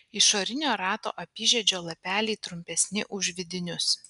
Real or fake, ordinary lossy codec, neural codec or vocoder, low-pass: real; MP3, 96 kbps; none; 10.8 kHz